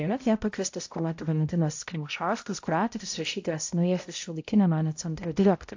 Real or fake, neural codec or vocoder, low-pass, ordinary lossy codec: fake; codec, 16 kHz, 0.5 kbps, X-Codec, HuBERT features, trained on balanced general audio; 7.2 kHz; AAC, 48 kbps